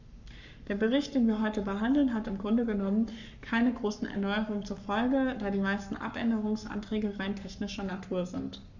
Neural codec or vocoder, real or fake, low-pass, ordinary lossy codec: codec, 44.1 kHz, 7.8 kbps, Pupu-Codec; fake; 7.2 kHz; none